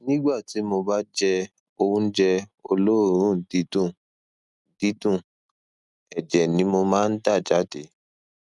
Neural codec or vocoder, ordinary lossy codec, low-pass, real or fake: none; none; 10.8 kHz; real